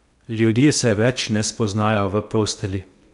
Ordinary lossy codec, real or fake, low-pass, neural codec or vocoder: MP3, 96 kbps; fake; 10.8 kHz; codec, 16 kHz in and 24 kHz out, 0.8 kbps, FocalCodec, streaming, 65536 codes